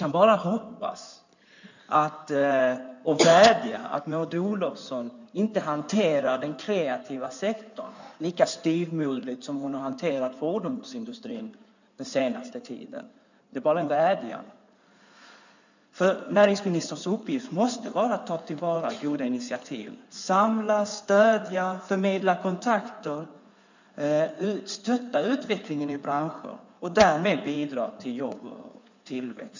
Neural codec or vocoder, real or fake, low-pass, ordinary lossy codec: codec, 16 kHz in and 24 kHz out, 2.2 kbps, FireRedTTS-2 codec; fake; 7.2 kHz; none